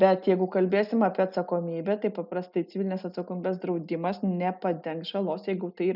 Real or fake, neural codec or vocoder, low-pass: real; none; 5.4 kHz